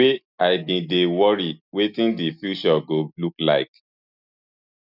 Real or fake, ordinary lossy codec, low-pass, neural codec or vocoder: real; none; 5.4 kHz; none